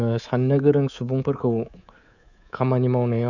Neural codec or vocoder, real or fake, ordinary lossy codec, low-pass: codec, 24 kHz, 3.1 kbps, DualCodec; fake; none; 7.2 kHz